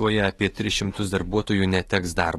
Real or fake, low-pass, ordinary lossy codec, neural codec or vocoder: fake; 19.8 kHz; AAC, 32 kbps; autoencoder, 48 kHz, 128 numbers a frame, DAC-VAE, trained on Japanese speech